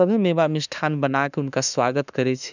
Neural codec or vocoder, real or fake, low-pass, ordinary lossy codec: autoencoder, 48 kHz, 32 numbers a frame, DAC-VAE, trained on Japanese speech; fake; 7.2 kHz; none